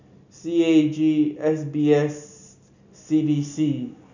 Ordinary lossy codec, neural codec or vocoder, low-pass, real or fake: none; none; 7.2 kHz; real